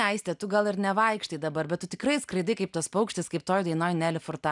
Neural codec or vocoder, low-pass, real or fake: none; 10.8 kHz; real